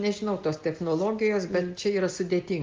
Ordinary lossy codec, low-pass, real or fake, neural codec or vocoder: Opus, 32 kbps; 7.2 kHz; real; none